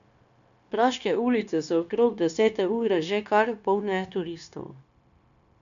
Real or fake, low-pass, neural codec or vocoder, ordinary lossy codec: fake; 7.2 kHz; codec, 16 kHz, 0.9 kbps, LongCat-Audio-Codec; none